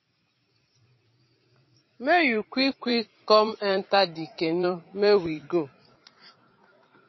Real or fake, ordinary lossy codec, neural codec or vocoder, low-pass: fake; MP3, 24 kbps; vocoder, 44.1 kHz, 80 mel bands, Vocos; 7.2 kHz